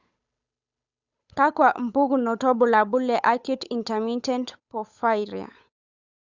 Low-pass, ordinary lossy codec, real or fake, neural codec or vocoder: 7.2 kHz; Opus, 64 kbps; fake; codec, 16 kHz, 8 kbps, FunCodec, trained on Chinese and English, 25 frames a second